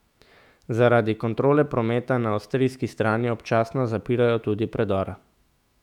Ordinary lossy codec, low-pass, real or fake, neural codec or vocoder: none; 19.8 kHz; fake; autoencoder, 48 kHz, 128 numbers a frame, DAC-VAE, trained on Japanese speech